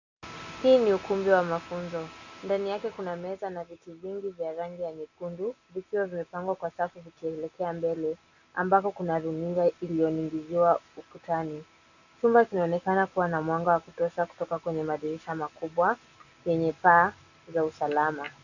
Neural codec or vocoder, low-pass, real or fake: none; 7.2 kHz; real